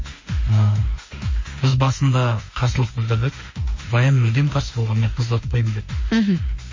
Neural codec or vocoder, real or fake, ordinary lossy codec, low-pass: autoencoder, 48 kHz, 32 numbers a frame, DAC-VAE, trained on Japanese speech; fake; MP3, 32 kbps; 7.2 kHz